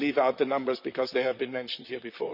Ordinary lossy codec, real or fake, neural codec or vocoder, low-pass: none; fake; vocoder, 44.1 kHz, 128 mel bands, Pupu-Vocoder; 5.4 kHz